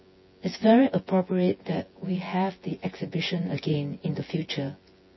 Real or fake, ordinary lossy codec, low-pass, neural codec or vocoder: fake; MP3, 24 kbps; 7.2 kHz; vocoder, 24 kHz, 100 mel bands, Vocos